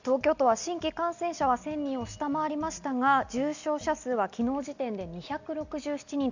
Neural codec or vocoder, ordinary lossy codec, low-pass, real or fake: none; none; 7.2 kHz; real